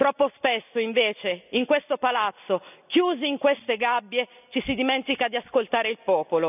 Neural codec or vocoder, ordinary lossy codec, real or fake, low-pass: none; none; real; 3.6 kHz